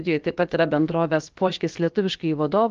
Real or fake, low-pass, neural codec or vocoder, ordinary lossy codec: fake; 7.2 kHz; codec, 16 kHz, about 1 kbps, DyCAST, with the encoder's durations; Opus, 24 kbps